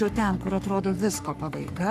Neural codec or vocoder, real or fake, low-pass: codec, 44.1 kHz, 3.4 kbps, Pupu-Codec; fake; 14.4 kHz